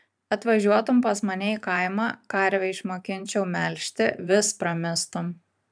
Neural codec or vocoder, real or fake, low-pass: vocoder, 44.1 kHz, 128 mel bands every 512 samples, BigVGAN v2; fake; 9.9 kHz